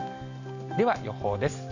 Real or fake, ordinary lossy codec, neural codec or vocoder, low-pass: real; none; none; 7.2 kHz